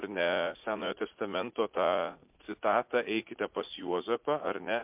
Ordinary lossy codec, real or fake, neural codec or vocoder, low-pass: MP3, 32 kbps; fake; vocoder, 44.1 kHz, 80 mel bands, Vocos; 3.6 kHz